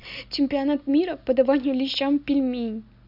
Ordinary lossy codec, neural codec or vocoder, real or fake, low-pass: none; none; real; 5.4 kHz